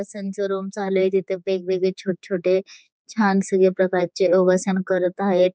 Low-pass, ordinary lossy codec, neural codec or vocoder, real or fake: none; none; codec, 16 kHz, 4 kbps, X-Codec, HuBERT features, trained on general audio; fake